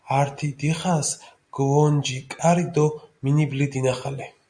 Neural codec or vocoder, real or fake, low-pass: none; real; 9.9 kHz